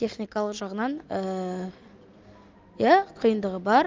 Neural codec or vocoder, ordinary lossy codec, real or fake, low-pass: none; Opus, 32 kbps; real; 7.2 kHz